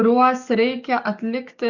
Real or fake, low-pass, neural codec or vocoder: fake; 7.2 kHz; codec, 44.1 kHz, 7.8 kbps, DAC